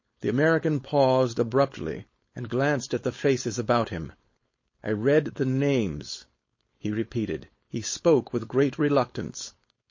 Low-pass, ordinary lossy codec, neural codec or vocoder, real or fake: 7.2 kHz; MP3, 32 kbps; codec, 16 kHz, 4.8 kbps, FACodec; fake